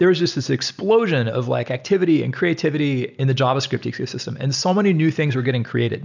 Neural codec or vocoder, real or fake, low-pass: none; real; 7.2 kHz